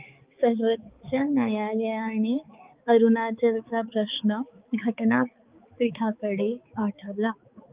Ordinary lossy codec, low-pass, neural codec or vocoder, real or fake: Opus, 32 kbps; 3.6 kHz; codec, 16 kHz, 4 kbps, X-Codec, HuBERT features, trained on balanced general audio; fake